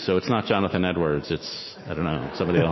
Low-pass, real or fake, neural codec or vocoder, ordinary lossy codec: 7.2 kHz; fake; autoencoder, 48 kHz, 128 numbers a frame, DAC-VAE, trained on Japanese speech; MP3, 24 kbps